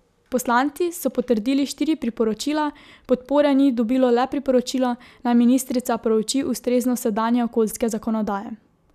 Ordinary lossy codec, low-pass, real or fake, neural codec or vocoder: none; 14.4 kHz; real; none